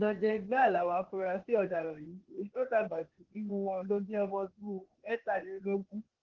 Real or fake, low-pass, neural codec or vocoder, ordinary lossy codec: fake; 7.2 kHz; codec, 16 kHz, 0.8 kbps, ZipCodec; Opus, 16 kbps